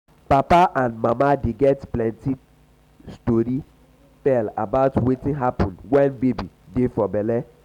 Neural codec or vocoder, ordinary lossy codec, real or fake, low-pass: none; none; real; 19.8 kHz